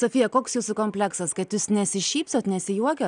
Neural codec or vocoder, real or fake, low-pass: none; real; 9.9 kHz